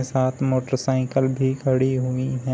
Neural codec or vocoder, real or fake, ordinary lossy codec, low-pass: none; real; none; none